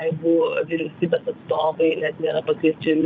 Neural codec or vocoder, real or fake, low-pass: vocoder, 44.1 kHz, 80 mel bands, Vocos; fake; 7.2 kHz